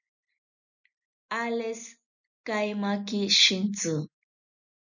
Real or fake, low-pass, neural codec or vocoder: real; 7.2 kHz; none